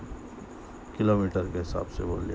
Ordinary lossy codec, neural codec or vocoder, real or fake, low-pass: none; none; real; none